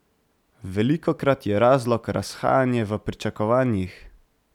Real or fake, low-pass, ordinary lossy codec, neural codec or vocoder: real; 19.8 kHz; none; none